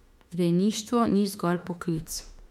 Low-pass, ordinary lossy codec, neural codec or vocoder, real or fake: 19.8 kHz; MP3, 96 kbps; autoencoder, 48 kHz, 32 numbers a frame, DAC-VAE, trained on Japanese speech; fake